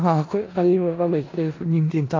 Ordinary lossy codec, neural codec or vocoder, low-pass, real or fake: AAC, 32 kbps; codec, 16 kHz in and 24 kHz out, 0.4 kbps, LongCat-Audio-Codec, four codebook decoder; 7.2 kHz; fake